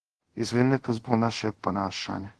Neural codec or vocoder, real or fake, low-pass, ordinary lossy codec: codec, 24 kHz, 0.5 kbps, DualCodec; fake; 10.8 kHz; Opus, 16 kbps